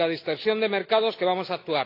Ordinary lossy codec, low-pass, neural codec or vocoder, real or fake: AAC, 32 kbps; 5.4 kHz; none; real